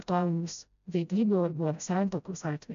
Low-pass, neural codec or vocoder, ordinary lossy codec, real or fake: 7.2 kHz; codec, 16 kHz, 0.5 kbps, FreqCodec, smaller model; AAC, 96 kbps; fake